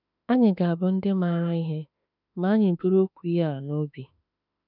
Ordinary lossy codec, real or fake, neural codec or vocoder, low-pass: none; fake; autoencoder, 48 kHz, 32 numbers a frame, DAC-VAE, trained on Japanese speech; 5.4 kHz